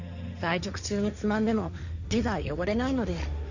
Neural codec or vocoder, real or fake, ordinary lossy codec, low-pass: codec, 16 kHz, 1.1 kbps, Voila-Tokenizer; fake; none; 7.2 kHz